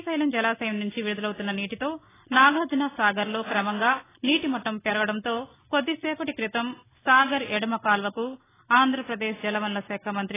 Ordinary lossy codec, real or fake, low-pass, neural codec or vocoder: AAC, 16 kbps; real; 3.6 kHz; none